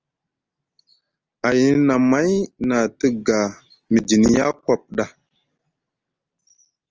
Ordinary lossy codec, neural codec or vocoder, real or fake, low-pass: Opus, 24 kbps; none; real; 7.2 kHz